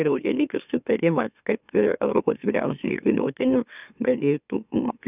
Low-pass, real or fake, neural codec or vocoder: 3.6 kHz; fake; autoencoder, 44.1 kHz, a latent of 192 numbers a frame, MeloTTS